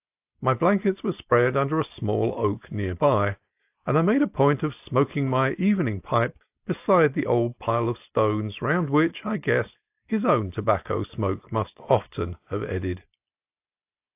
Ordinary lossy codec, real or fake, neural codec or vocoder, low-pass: AAC, 32 kbps; real; none; 3.6 kHz